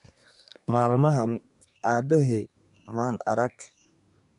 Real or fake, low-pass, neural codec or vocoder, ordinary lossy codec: fake; 10.8 kHz; codec, 24 kHz, 1 kbps, SNAC; none